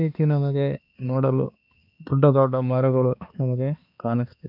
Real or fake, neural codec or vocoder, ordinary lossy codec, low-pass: fake; codec, 16 kHz, 2 kbps, X-Codec, HuBERT features, trained on balanced general audio; none; 5.4 kHz